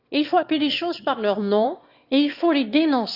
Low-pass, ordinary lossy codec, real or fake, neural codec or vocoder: 5.4 kHz; Opus, 64 kbps; fake; autoencoder, 22.05 kHz, a latent of 192 numbers a frame, VITS, trained on one speaker